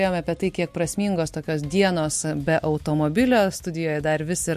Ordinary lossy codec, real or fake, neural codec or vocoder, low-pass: MP3, 64 kbps; real; none; 14.4 kHz